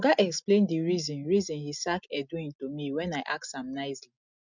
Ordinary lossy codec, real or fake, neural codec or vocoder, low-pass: none; real; none; 7.2 kHz